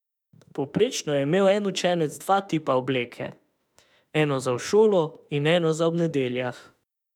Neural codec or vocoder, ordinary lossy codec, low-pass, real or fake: autoencoder, 48 kHz, 32 numbers a frame, DAC-VAE, trained on Japanese speech; none; 19.8 kHz; fake